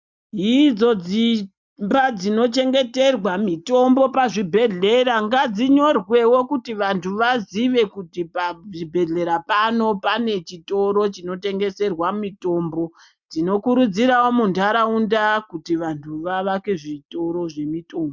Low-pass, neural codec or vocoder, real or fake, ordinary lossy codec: 7.2 kHz; none; real; MP3, 64 kbps